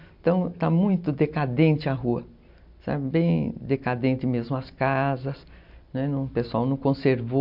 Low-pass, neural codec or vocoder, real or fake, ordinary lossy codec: 5.4 kHz; none; real; MP3, 48 kbps